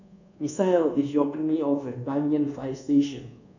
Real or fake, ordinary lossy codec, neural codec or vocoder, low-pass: fake; none; codec, 24 kHz, 1.2 kbps, DualCodec; 7.2 kHz